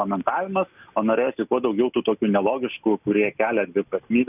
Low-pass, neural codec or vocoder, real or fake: 3.6 kHz; none; real